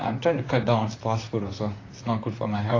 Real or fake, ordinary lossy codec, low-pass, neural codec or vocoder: fake; AAC, 32 kbps; 7.2 kHz; vocoder, 44.1 kHz, 128 mel bands, Pupu-Vocoder